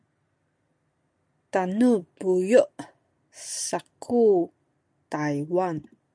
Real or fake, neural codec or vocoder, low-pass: real; none; 9.9 kHz